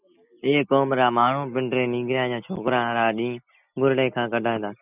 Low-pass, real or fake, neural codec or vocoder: 3.6 kHz; real; none